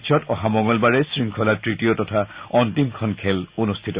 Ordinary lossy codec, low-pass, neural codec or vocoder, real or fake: Opus, 64 kbps; 3.6 kHz; none; real